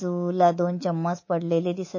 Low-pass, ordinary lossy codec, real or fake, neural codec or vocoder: 7.2 kHz; MP3, 32 kbps; fake; codec, 24 kHz, 3.1 kbps, DualCodec